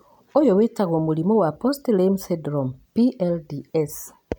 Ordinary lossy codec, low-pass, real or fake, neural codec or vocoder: none; none; real; none